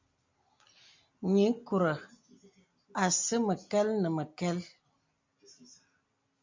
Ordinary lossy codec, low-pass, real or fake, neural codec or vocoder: MP3, 48 kbps; 7.2 kHz; real; none